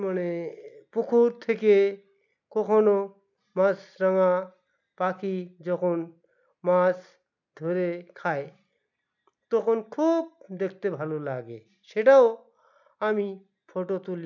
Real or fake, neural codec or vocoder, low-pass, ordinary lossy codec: real; none; 7.2 kHz; none